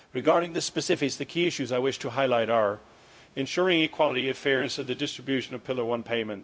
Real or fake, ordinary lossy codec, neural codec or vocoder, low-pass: fake; none; codec, 16 kHz, 0.4 kbps, LongCat-Audio-Codec; none